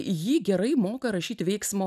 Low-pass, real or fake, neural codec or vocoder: 14.4 kHz; real; none